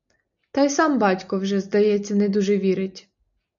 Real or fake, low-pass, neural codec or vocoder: real; 7.2 kHz; none